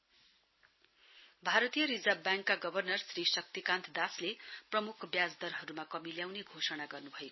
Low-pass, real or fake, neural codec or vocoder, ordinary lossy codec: 7.2 kHz; real; none; MP3, 24 kbps